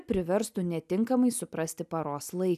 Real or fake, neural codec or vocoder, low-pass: real; none; 14.4 kHz